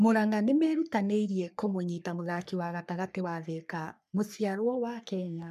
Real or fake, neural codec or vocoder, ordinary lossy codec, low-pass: fake; codec, 44.1 kHz, 3.4 kbps, Pupu-Codec; MP3, 96 kbps; 14.4 kHz